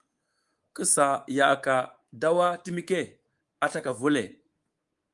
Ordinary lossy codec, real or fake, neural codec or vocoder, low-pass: Opus, 24 kbps; fake; codec, 24 kHz, 3.1 kbps, DualCodec; 10.8 kHz